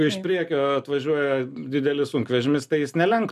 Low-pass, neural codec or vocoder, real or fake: 14.4 kHz; none; real